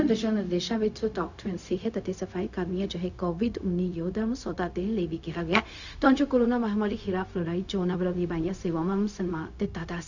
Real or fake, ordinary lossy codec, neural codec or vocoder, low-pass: fake; none; codec, 16 kHz, 0.4 kbps, LongCat-Audio-Codec; 7.2 kHz